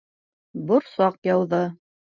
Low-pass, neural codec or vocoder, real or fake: 7.2 kHz; none; real